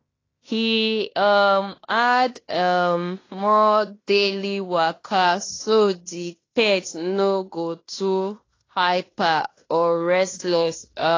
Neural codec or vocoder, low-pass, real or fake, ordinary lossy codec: codec, 16 kHz in and 24 kHz out, 0.9 kbps, LongCat-Audio-Codec, fine tuned four codebook decoder; 7.2 kHz; fake; AAC, 32 kbps